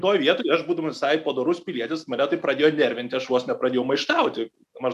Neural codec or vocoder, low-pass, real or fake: none; 14.4 kHz; real